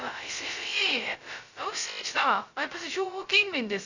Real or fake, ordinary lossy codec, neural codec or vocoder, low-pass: fake; Opus, 64 kbps; codec, 16 kHz, 0.2 kbps, FocalCodec; 7.2 kHz